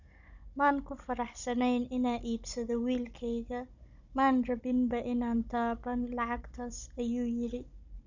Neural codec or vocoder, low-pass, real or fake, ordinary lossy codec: codec, 16 kHz, 16 kbps, FunCodec, trained on Chinese and English, 50 frames a second; 7.2 kHz; fake; none